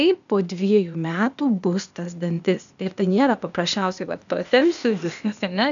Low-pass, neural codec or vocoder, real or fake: 7.2 kHz; codec, 16 kHz, 0.8 kbps, ZipCodec; fake